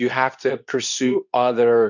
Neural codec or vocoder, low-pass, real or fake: codec, 24 kHz, 0.9 kbps, WavTokenizer, medium speech release version 2; 7.2 kHz; fake